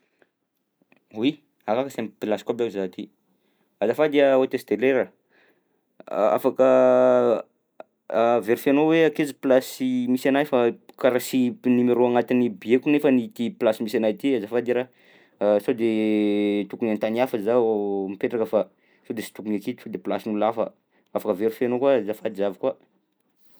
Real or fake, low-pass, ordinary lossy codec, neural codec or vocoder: real; none; none; none